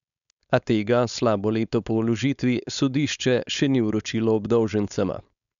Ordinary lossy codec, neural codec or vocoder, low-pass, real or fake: none; codec, 16 kHz, 4.8 kbps, FACodec; 7.2 kHz; fake